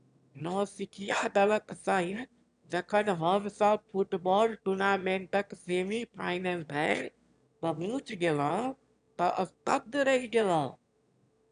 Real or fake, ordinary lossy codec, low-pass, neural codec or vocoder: fake; none; 9.9 kHz; autoencoder, 22.05 kHz, a latent of 192 numbers a frame, VITS, trained on one speaker